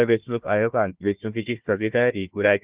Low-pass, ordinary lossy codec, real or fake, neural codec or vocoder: 3.6 kHz; Opus, 24 kbps; fake; codec, 16 kHz, 1 kbps, FunCodec, trained on Chinese and English, 50 frames a second